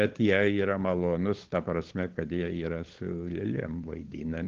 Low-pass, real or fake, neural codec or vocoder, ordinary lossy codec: 7.2 kHz; fake; codec, 16 kHz, 16 kbps, FunCodec, trained on LibriTTS, 50 frames a second; Opus, 16 kbps